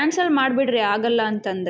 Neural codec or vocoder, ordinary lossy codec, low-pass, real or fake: none; none; none; real